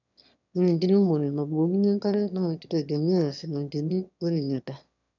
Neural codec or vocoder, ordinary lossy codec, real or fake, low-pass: autoencoder, 22.05 kHz, a latent of 192 numbers a frame, VITS, trained on one speaker; none; fake; 7.2 kHz